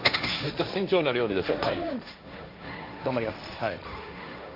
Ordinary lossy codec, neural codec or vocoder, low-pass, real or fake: none; codec, 16 kHz, 1.1 kbps, Voila-Tokenizer; 5.4 kHz; fake